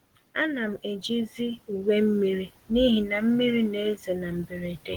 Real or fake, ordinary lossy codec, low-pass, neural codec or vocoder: real; Opus, 16 kbps; 19.8 kHz; none